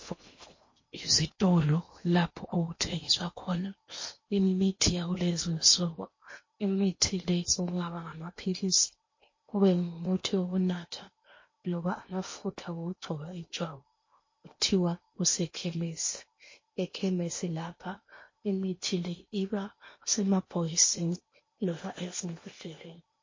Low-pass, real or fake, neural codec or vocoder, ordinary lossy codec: 7.2 kHz; fake; codec, 16 kHz in and 24 kHz out, 0.8 kbps, FocalCodec, streaming, 65536 codes; MP3, 32 kbps